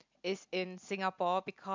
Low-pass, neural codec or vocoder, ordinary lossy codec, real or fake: 7.2 kHz; none; MP3, 64 kbps; real